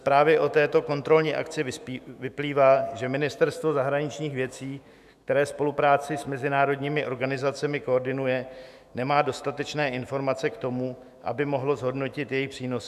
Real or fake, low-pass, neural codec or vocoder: fake; 14.4 kHz; autoencoder, 48 kHz, 128 numbers a frame, DAC-VAE, trained on Japanese speech